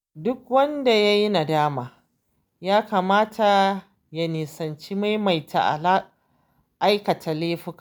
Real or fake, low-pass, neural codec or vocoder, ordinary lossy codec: real; none; none; none